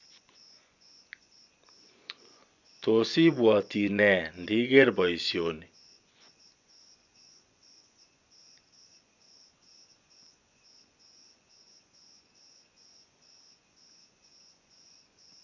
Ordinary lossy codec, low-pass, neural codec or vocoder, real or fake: none; 7.2 kHz; vocoder, 24 kHz, 100 mel bands, Vocos; fake